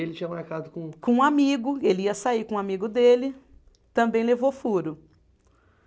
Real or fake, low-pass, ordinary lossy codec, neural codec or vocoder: real; none; none; none